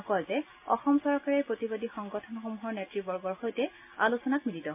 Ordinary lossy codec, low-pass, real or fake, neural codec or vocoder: MP3, 32 kbps; 3.6 kHz; real; none